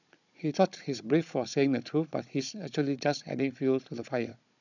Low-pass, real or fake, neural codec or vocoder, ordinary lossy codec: 7.2 kHz; fake; codec, 16 kHz, 16 kbps, FunCodec, trained on Chinese and English, 50 frames a second; none